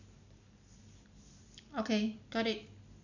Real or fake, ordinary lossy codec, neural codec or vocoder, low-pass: real; none; none; 7.2 kHz